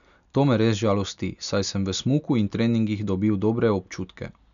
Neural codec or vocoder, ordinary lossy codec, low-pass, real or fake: none; none; 7.2 kHz; real